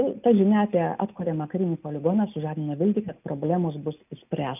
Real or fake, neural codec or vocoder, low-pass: real; none; 3.6 kHz